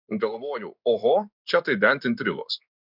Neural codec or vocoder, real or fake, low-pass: codec, 16 kHz in and 24 kHz out, 1 kbps, XY-Tokenizer; fake; 5.4 kHz